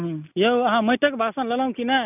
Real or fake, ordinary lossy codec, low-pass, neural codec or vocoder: real; none; 3.6 kHz; none